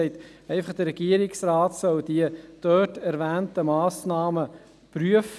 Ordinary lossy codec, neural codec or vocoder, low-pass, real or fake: none; none; none; real